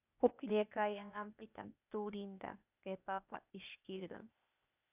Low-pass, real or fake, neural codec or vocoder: 3.6 kHz; fake; codec, 16 kHz, 0.8 kbps, ZipCodec